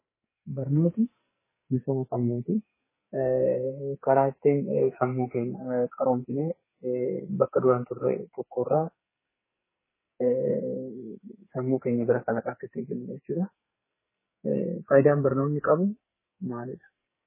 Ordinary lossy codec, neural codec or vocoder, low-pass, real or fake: MP3, 16 kbps; codec, 44.1 kHz, 2.6 kbps, SNAC; 3.6 kHz; fake